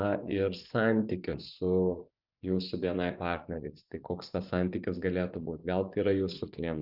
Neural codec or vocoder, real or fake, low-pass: vocoder, 24 kHz, 100 mel bands, Vocos; fake; 5.4 kHz